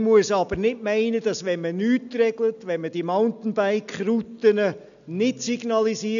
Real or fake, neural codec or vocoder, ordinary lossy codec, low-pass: real; none; none; 7.2 kHz